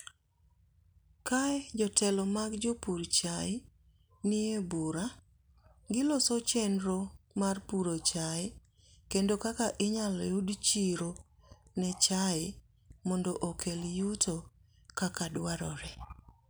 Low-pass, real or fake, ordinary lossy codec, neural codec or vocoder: none; real; none; none